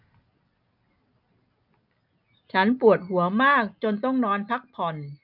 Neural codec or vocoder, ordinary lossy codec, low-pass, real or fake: none; none; 5.4 kHz; real